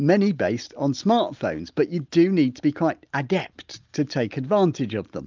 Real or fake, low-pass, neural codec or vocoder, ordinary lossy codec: real; 7.2 kHz; none; Opus, 24 kbps